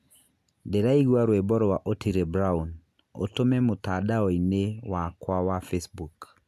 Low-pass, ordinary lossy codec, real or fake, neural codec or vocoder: 14.4 kHz; none; real; none